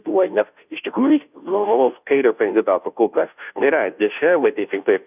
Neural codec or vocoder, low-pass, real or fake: codec, 16 kHz, 0.5 kbps, FunCodec, trained on Chinese and English, 25 frames a second; 3.6 kHz; fake